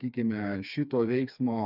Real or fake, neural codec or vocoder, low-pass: fake; codec, 16 kHz, 4 kbps, FreqCodec, smaller model; 5.4 kHz